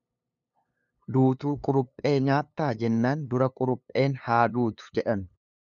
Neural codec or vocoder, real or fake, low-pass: codec, 16 kHz, 2 kbps, FunCodec, trained on LibriTTS, 25 frames a second; fake; 7.2 kHz